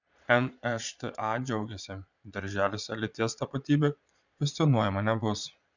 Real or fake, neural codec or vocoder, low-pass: fake; vocoder, 22.05 kHz, 80 mel bands, Vocos; 7.2 kHz